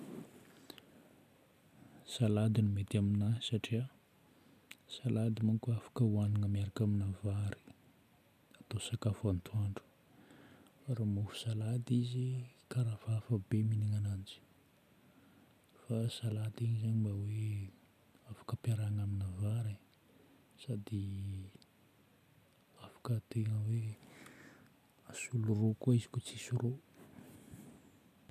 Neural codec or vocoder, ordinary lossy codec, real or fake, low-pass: none; none; real; 14.4 kHz